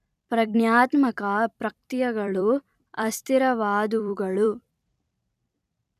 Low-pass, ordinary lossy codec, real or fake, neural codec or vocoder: 14.4 kHz; none; fake; vocoder, 44.1 kHz, 128 mel bands every 256 samples, BigVGAN v2